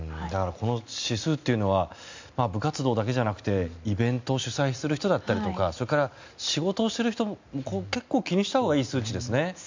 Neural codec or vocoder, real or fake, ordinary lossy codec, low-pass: none; real; MP3, 64 kbps; 7.2 kHz